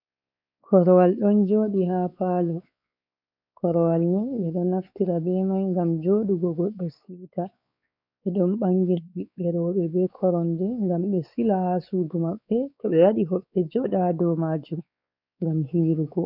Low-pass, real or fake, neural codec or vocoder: 5.4 kHz; fake; codec, 16 kHz, 4 kbps, X-Codec, WavLM features, trained on Multilingual LibriSpeech